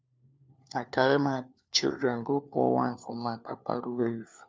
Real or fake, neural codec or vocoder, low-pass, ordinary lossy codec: fake; codec, 16 kHz, 4 kbps, FunCodec, trained on LibriTTS, 50 frames a second; none; none